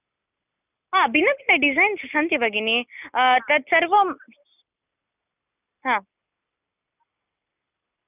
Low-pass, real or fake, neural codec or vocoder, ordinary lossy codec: 3.6 kHz; real; none; none